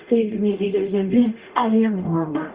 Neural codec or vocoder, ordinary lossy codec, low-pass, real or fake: codec, 44.1 kHz, 0.9 kbps, DAC; Opus, 32 kbps; 3.6 kHz; fake